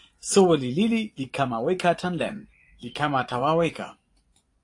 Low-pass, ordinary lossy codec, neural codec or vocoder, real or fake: 10.8 kHz; AAC, 48 kbps; vocoder, 24 kHz, 100 mel bands, Vocos; fake